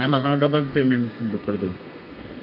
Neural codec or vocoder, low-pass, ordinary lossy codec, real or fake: codec, 32 kHz, 1.9 kbps, SNAC; 5.4 kHz; none; fake